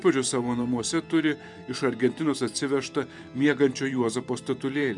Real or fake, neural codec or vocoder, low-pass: fake; vocoder, 44.1 kHz, 128 mel bands every 512 samples, BigVGAN v2; 10.8 kHz